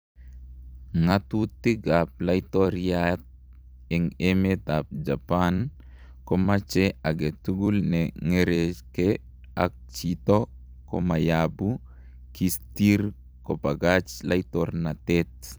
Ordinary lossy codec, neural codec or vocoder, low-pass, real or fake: none; vocoder, 44.1 kHz, 128 mel bands every 256 samples, BigVGAN v2; none; fake